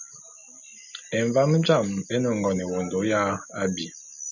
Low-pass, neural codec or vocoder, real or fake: 7.2 kHz; none; real